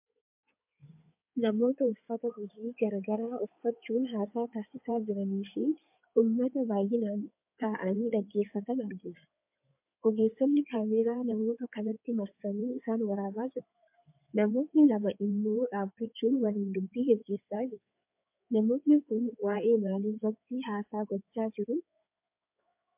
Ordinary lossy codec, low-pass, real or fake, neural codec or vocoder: MP3, 32 kbps; 3.6 kHz; fake; codec, 16 kHz in and 24 kHz out, 2.2 kbps, FireRedTTS-2 codec